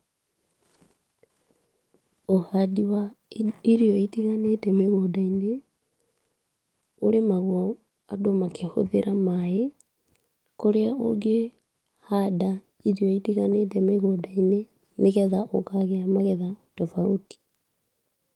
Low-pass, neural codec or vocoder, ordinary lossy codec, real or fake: 19.8 kHz; autoencoder, 48 kHz, 128 numbers a frame, DAC-VAE, trained on Japanese speech; Opus, 24 kbps; fake